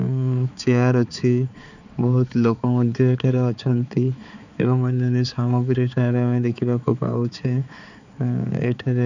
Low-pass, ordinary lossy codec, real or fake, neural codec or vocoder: 7.2 kHz; none; fake; codec, 16 kHz, 4 kbps, X-Codec, HuBERT features, trained on balanced general audio